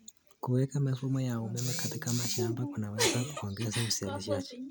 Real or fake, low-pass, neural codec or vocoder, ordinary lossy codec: real; none; none; none